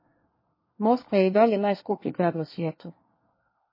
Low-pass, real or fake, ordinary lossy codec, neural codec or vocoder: 5.4 kHz; fake; MP3, 24 kbps; codec, 24 kHz, 1 kbps, SNAC